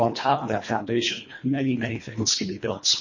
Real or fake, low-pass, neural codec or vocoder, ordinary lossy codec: fake; 7.2 kHz; codec, 24 kHz, 1.5 kbps, HILCodec; MP3, 32 kbps